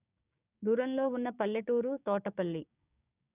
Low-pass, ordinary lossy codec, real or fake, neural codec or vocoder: 3.6 kHz; none; fake; codec, 16 kHz, 6 kbps, DAC